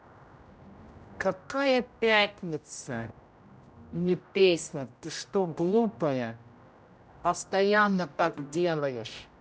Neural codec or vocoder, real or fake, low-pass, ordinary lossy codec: codec, 16 kHz, 0.5 kbps, X-Codec, HuBERT features, trained on general audio; fake; none; none